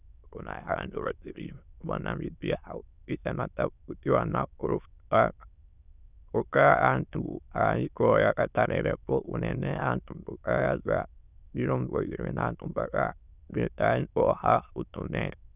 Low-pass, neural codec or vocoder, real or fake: 3.6 kHz; autoencoder, 22.05 kHz, a latent of 192 numbers a frame, VITS, trained on many speakers; fake